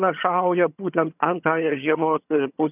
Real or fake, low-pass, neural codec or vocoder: fake; 3.6 kHz; vocoder, 22.05 kHz, 80 mel bands, HiFi-GAN